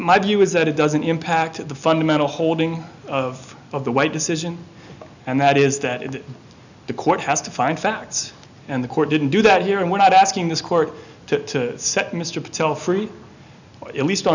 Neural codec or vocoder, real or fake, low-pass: none; real; 7.2 kHz